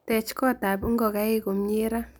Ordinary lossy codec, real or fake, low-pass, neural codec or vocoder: none; real; none; none